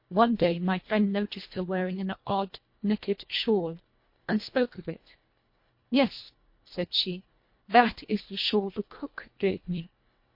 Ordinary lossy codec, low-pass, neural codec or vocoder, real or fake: MP3, 32 kbps; 5.4 kHz; codec, 24 kHz, 1.5 kbps, HILCodec; fake